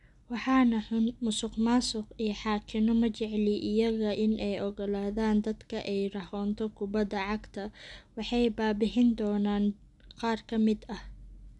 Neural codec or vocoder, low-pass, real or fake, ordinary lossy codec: none; 10.8 kHz; real; none